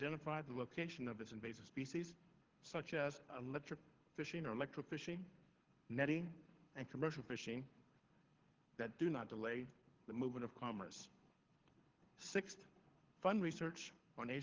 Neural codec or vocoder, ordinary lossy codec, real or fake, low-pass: codec, 24 kHz, 6 kbps, HILCodec; Opus, 16 kbps; fake; 7.2 kHz